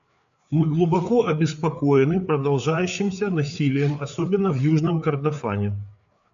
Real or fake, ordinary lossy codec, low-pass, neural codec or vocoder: fake; AAC, 96 kbps; 7.2 kHz; codec, 16 kHz, 4 kbps, FreqCodec, larger model